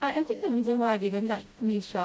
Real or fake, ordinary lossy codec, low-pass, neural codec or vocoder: fake; none; none; codec, 16 kHz, 0.5 kbps, FreqCodec, smaller model